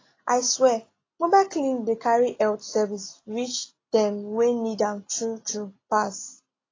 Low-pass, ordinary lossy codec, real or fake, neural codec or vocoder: 7.2 kHz; AAC, 32 kbps; real; none